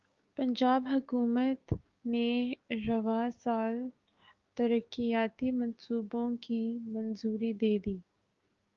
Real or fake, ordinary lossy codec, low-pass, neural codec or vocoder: fake; Opus, 32 kbps; 7.2 kHz; codec, 16 kHz, 6 kbps, DAC